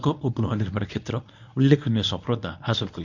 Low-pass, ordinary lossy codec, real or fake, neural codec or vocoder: 7.2 kHz; none; fake; codec, 24 kHz, 0.9 kbps, WavTokenizer, medium speech release version 1